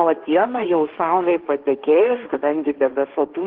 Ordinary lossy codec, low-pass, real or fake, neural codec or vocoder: Opus, 16 kbps; 5.4 kHz; fake; codec, 16 kHz, 1.1 kbps, Voila-Tokenizer